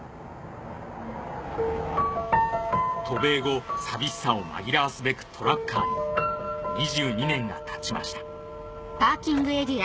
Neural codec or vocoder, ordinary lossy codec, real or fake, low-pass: none; none; real; none